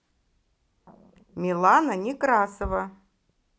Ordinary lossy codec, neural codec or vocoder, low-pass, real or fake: none; none; none; real